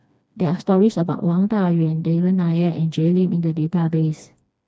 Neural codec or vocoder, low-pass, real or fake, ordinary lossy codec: codec, 16 kHz, 2 kbps, FreqCodec, smaller model; none; fake; none